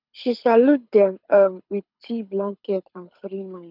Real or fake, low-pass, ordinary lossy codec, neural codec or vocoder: fake; 5.4 kHz; none; codec, 24 kHz, 6 kbps, HILCodec